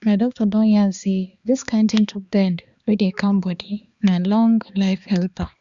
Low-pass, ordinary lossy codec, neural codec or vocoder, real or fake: 7.2 kHz; Opus, 64 kbps; codec, 16 kHz, 2 kbps, X-Codec, HuBERT features, trained on balanced general audio; fake